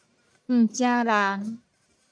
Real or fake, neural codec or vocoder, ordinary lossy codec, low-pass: fake; codec, 44.1 kHz, 1.7 kbps, Pupu-Codec; MP3, 96 kbps; 9.9 kHz